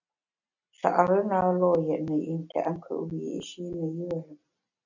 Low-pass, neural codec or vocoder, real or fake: 7.2 kHz; none; real